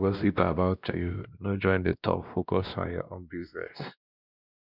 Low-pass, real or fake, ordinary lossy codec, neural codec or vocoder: 5.4 kHz; fake; none; codec, 16 kHz, 1 kbps, X-Codec, WavLM features, trained on Multilingual LibriSpeech